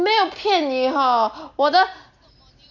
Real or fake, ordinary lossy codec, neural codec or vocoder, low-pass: real; none; none; 7.2 kHz